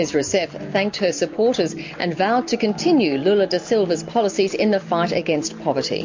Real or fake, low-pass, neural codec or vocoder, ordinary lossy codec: real; 7.2 kHz; none; MP3, 48 kbps